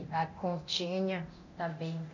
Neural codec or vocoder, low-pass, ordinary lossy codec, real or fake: codec, 24 kHz, 0.9 kbps, DualCodec; 7.2 kHz; none; fake